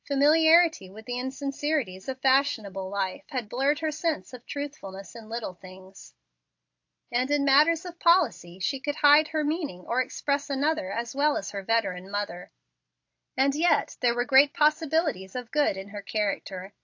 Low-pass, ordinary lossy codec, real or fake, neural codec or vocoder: 7.2 kHz; AAC, 48 kbps; real; none